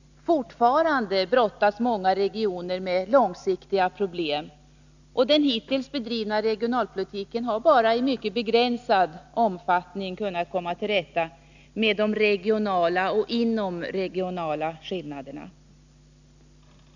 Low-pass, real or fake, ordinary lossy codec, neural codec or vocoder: 7.2 kHz; real; none; none